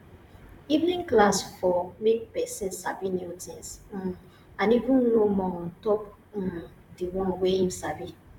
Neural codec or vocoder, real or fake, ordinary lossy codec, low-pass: vocoder, 44.1 kHz, 128 mel bands, Pupu-Vocoder; fake; none; 19.8 kHz